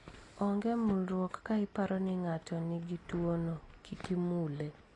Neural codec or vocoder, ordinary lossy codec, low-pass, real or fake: none; AAC, 32 kbps; 10.8 kHz; real